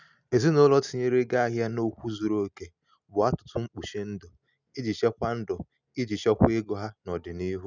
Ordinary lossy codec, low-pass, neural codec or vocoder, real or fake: none; 7.2 kHz; none; real